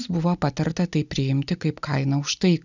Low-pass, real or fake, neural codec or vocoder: 7.2 kHz; real; none